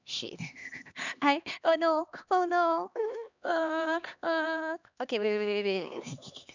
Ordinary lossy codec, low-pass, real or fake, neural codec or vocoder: none; 7.2 kHz; fake; codec, 16 kHz, 2 kbps, X-Codec, HuBERT features, trained on LibriSpeech